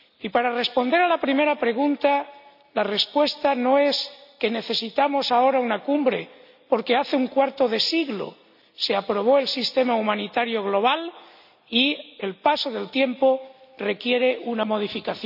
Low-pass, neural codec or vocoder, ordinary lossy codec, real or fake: 5.4 kHz; none; none; real